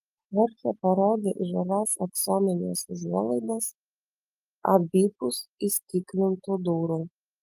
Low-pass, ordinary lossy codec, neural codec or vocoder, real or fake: 14.4 kHz; Opus, 32 kbps; none; real